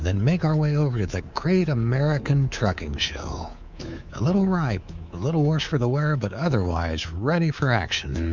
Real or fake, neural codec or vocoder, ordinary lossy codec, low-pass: fake; codec, 24 kHz, 3.1 kbps, DualCodec; Opus, 64 kbps; 7.2 kHz